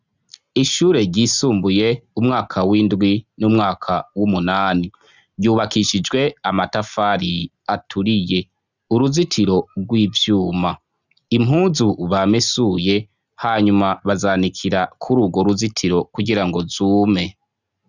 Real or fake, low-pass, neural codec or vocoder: real; 7.2 kHz; none